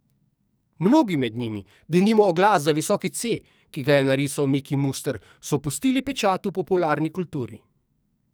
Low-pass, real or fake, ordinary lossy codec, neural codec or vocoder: none; fake; none; codec, 44.1 kHz, 2.6 kbps, SNAC